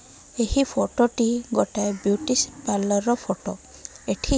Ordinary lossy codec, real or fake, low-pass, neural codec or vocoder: none; real; none; none